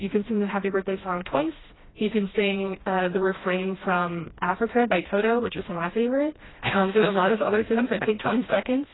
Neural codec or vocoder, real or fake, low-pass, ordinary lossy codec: codec, 16 kHz, 1 kbps, FreqCodec, smaller model; fake; 7.2 kHz; AAC, 16 kbps